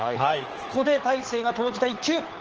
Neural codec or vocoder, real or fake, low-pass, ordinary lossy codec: codec, 16 kHz, 4 kbps, FunCodec, trained on LibriTTS, 50 frames a second; fake; 7.2 kHz; Opus, 16 kbps